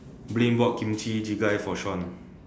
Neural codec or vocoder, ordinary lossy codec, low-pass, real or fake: none; none; none; real